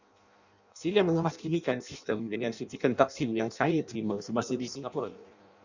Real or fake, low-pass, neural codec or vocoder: fake; 7.2 kHz; codec, 16 kHz in and 24 kHz out, 0.6 kbps, FireRedTTS-2 codec